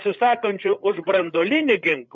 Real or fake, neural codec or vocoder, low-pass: fake; codec, 16 kHz, 8 kbps, FreqCodec, larger model; 7.2 kHz